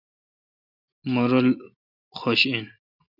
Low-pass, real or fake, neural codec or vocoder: 5.4 kHz; real; none